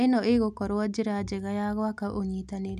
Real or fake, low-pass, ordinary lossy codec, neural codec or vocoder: real; 14.4 kHz; none; none